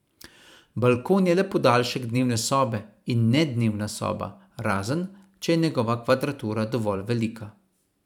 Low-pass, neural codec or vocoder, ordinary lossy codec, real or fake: 19.8 kHz; vocoder, 44.1 kHz, 128 mel bands every 512 samples, BigVGAN v2; none; fake